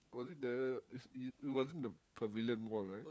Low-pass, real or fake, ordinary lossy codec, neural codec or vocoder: none; fake; none; codec, 16 kHz, 4 kbps, FunCodec, trained on LibriTTS, 50 frames a second